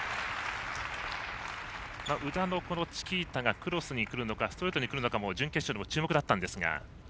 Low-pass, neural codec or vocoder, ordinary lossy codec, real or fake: none; none; none; real